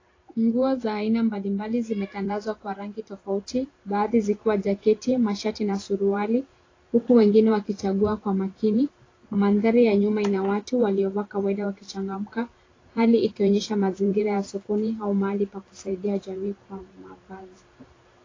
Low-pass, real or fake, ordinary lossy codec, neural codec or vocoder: 7.2 kHz; fake; AAC, 32 kbps; vocoder, 44.1 kHz, 128 mel bands every 512 samples, BigVGAN v2